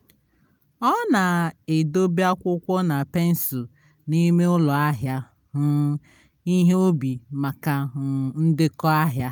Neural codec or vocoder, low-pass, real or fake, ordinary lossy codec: none; none; real; none